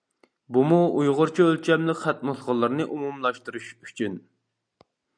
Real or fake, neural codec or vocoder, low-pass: real; none; 9.9 kHz